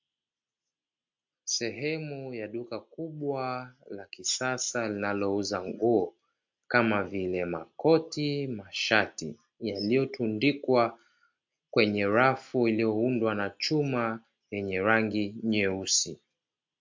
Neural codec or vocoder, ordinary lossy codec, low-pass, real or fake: none; MP3, 48 kbps; 7.2 kHz; real